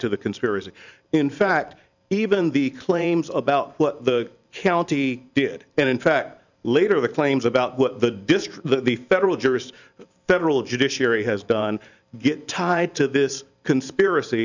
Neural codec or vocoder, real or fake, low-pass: vocoder, 44.1 kHz, 128 mel bands every 512 samples, BigVGAN v2; fake; 7.2 kHz